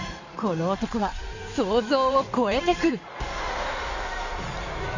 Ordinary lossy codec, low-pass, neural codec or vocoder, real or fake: none; 7.2 kHz; codec, 16 kHz in and 24 kHz out, 2.2 kbps, FireRedTTS-2 codec; fake